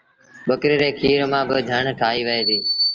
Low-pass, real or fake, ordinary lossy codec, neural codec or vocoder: 7.2 kHz; real; Opus, 24 kbps; none